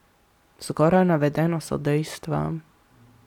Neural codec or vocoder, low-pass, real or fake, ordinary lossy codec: none; 19.8 kHz; real; none